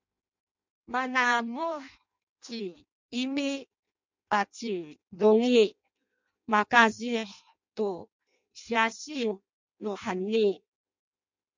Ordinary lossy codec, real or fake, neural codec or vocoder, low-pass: MP3, 64 kbps; fake; codec, 16 kHz in and 24 kHz out, 0.6 kbps, FireRedTTS-2 codec; 7.2 kHz